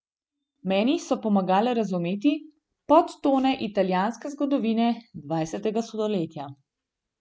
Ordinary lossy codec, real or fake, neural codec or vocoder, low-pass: none; real; none; none